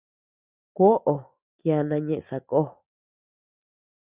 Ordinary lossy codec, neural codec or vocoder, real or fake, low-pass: Opus, 64 kbps; none; real; 3.6 kHz